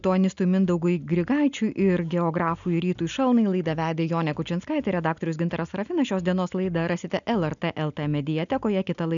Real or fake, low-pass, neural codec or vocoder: real; 7.2 kHz; none